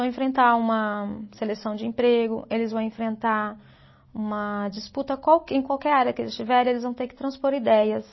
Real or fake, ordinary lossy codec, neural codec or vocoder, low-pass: real; MP3, 24 kbps; none; 7.2 kHz